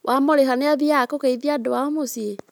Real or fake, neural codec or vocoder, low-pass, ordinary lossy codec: real; none; none; none